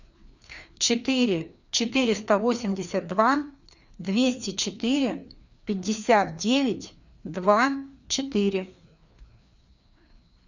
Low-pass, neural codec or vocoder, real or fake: 7.2 kHz; codec, 16 kHz, 2 kbps, FreqCodec, larger model; fake